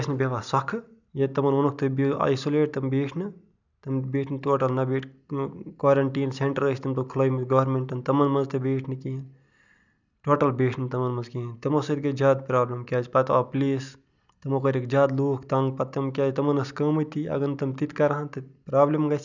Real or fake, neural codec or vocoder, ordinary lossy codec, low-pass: real; none; none; 7.2 kHz